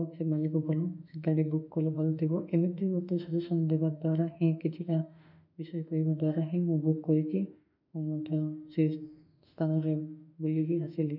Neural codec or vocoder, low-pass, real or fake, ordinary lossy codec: autoencoder, 48 kHz, 32 numbers a frame, DAC-VAE, trained on Japanese speech; 5.4 kHz; fake; none